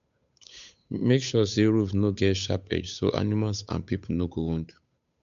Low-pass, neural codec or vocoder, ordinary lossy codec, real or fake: 7.2 kHz; codec, 16 kHz, 8 kbps, FunCodec, trained on Chinese and English, 25 frames a second; MP3, 64 kbps; fake